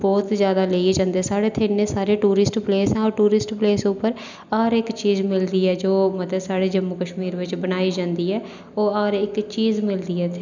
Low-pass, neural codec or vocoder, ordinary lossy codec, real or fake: 7.2 kHz; none; none; real